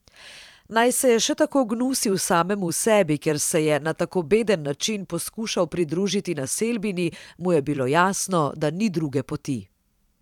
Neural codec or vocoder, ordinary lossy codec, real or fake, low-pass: vocoder, 44.1 kHz, 128 mel bands every 512 samples, BigVGAN v2; none; fake; 19.8 kHz